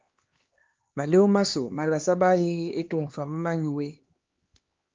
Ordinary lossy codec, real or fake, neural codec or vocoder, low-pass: Opus, 32 kbps; fake; codec, 16 kHz, 2 kbps, X-Codec, HuBERT features, trained on LibriSpeech; 7.2 kHz